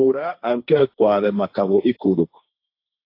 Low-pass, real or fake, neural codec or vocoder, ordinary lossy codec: 5.4 kHz; fake; codec, 16 kHz, 1.1 kbps, Voila-Tokenizer; AAC, 32 kbps